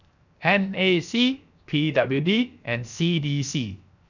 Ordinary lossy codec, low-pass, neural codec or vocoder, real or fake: none; 7.2 kHz; codec, 16 kHz, 0.7 kbps, FocalCodec; fake